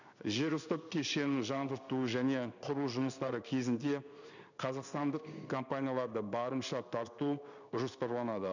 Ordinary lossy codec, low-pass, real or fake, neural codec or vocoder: none; 7.2 kHz; fake; codec, 16 kHz in and 24 kHz out, 1 kbps, XY-Tokenizer